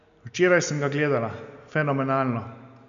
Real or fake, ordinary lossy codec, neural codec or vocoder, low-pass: real; none; none; 7.2 kHz